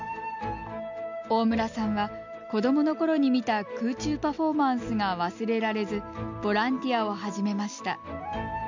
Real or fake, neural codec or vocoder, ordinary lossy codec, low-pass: real; none; MP3, 64 kbps; 7.2 kHz